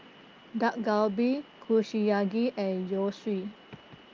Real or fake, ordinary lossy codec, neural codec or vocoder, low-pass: real; Opus, 24 kbps; none; 7.2 kHz